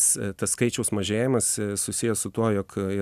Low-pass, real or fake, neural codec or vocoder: 14.4 kHz; real; none